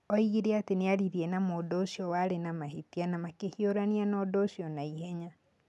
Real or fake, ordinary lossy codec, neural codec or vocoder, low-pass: real; none; none; none